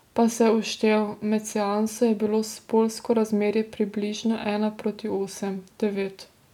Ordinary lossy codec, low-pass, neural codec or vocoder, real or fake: none; 19.8 kHz; none; real